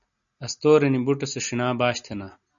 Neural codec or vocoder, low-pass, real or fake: none; 7.2 kHz; real